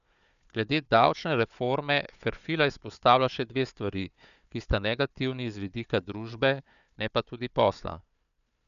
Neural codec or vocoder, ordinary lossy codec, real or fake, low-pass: codec, 16 kHz, 4 kbps, FunCodec, trained on Chinese and English, 50 frames a second; none; fake; 7.2 kHz